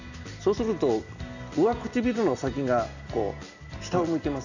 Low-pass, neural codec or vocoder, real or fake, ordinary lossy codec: 7.2 kHz; none; real; none